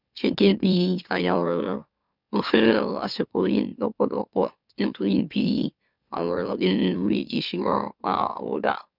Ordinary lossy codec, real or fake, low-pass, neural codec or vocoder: none; fake; 5.4 kHz; autoencoder, 44.1 kHz, a latent of 192 numbers a frame, MeloTTS